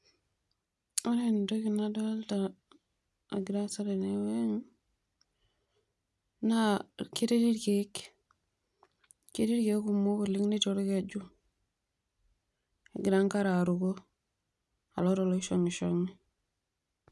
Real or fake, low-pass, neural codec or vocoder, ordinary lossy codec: real; none; none; none